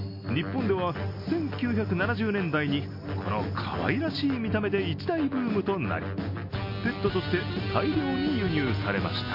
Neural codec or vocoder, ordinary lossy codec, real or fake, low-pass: none; MP3, 32 kbps; real; 5.4 kHz